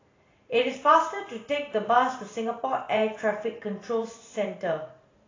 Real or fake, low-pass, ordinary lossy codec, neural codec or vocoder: fake; 7.2 kHz; AAC, 32 kbps; vocoder, 44.1 kHz, 128 mel bands every 512 samples, BigVGAN v2